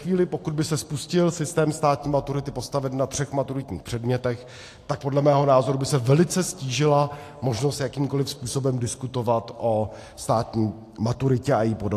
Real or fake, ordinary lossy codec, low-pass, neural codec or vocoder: real; AAC, 64 kbps; 14.4 kHz; none